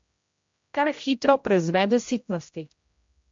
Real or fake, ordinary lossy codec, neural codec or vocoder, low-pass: fake; MP3, 48 kbps; codec, 16 kHz, 0.5 kbps, X-Codec, HuBERT features, trained on general audio; 7.2 kHz